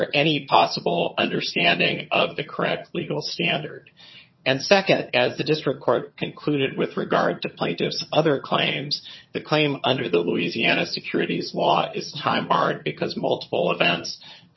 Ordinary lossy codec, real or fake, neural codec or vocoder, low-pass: MP3, 24 kbps; fake; vocoder, 22.05 kHz, 80 mel bands, HiFi-GAN; 7.2 kHz